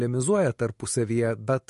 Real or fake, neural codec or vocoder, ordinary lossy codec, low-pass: real; none; MP3, 48 kbps; 14.4 kHz